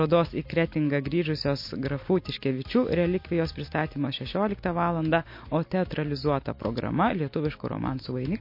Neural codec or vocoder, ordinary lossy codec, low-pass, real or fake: none; MP3, 32 kbps; 5.4 kHz; real